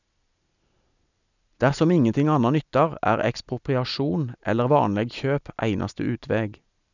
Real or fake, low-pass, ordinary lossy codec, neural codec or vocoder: real; 7.2 kHz; none; none